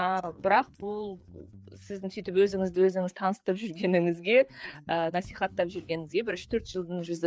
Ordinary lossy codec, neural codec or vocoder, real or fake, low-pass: none; codec, 16 kHz, 4 kbps, FreqCodec, larger model; fake; none